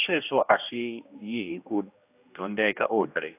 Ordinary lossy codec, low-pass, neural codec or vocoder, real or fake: AAC, 32 kbps; 3.6 kHz; codec, 16 kHz, 1 kbps, X-Codec, HuBERT features, trained on general audio; fake